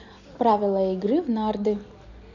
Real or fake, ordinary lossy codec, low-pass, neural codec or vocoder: real; none; 7.2 kHz; none